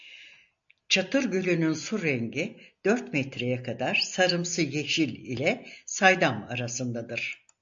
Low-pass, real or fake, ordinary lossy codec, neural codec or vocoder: 7.2 kHz; real; AAC, 64 kbps; none